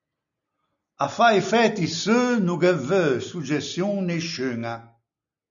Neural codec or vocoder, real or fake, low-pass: none; real; 7.2 kHz